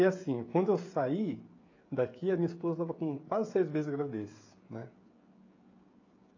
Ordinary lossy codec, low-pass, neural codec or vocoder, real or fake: AAC, 32 kbps; 7.2 kHz; codec, 16 kHz, 16 kbps, FreqCodec, smaller model; fake